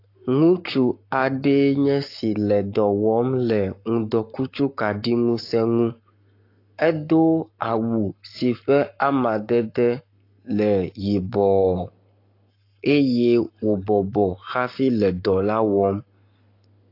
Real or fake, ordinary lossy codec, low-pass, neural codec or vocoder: fake; AAC, 32 kbps; 5.4 kHz; codec, 44.1 kHz, 7.8 kbps, Pupu-Codec